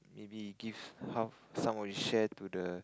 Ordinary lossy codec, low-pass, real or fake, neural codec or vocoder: none; none; real; none